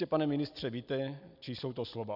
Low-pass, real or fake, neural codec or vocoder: 5.4 kHz; real; none